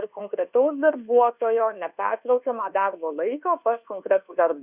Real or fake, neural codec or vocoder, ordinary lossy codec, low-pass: fake; codec, 24 kHz, 1.2 kbps, DualCodec; Opus, 64 kbps; 3.6 kHz